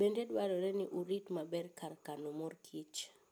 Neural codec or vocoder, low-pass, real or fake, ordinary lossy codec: none; none; real; none